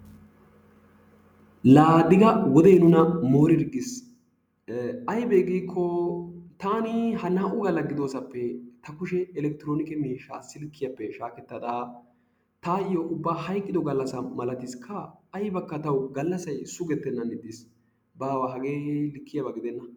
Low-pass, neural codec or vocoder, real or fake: 19.8 kHz; none; real